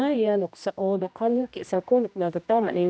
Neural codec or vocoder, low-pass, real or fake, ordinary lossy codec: codec, 16 kHz, 1 kbps, X-Codec, HuBERT features, trained on general audio; none; fake; none